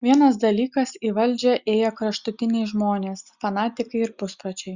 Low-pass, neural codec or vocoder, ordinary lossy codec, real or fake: 7.2 kHz; none; Opus, 64 kbps; real